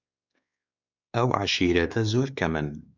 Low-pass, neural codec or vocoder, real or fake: 7.2 kHz; codec, 16 kHz, 4 kbps, X-Codec, WavLM features, trained on Multilingual LibriSpeech; fake